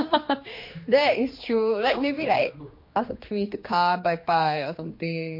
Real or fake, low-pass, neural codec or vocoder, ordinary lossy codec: fake; 5.4 kHz; codec, 16 kHz, 2 kbps, X-Codec, HuBERT features, trained on general audio; MP3, 32 kbps